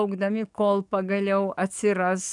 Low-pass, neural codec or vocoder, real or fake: 10.8 kHz; codec, 44.1 kHz, 7.8 kbps, DAC; fake